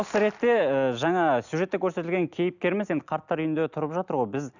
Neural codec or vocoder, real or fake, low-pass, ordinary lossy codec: none; real; 7.2 kHz; none